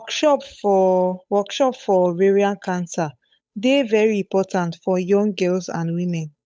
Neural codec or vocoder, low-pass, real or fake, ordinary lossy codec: codec, 16 kHz, 8 kbps, FunCodec, trained on Chinese and English, 25 frames a second; none; fake; none